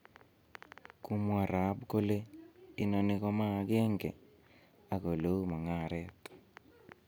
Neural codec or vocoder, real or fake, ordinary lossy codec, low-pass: none; real; none; none